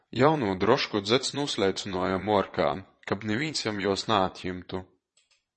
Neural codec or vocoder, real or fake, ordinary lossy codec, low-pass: vocoder, 22.05 kHz, 80 mel bands, Vocos; fake; MP3, 32 kbps; 9.9 kHz